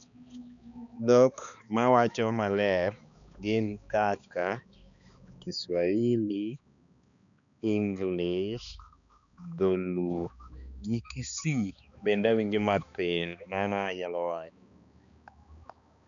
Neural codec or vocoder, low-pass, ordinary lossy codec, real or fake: codec, 16 kHz, 2 kbps, X-Codec, HuBERT features, trained on balanced general audio; 7.2 kHz; none; fake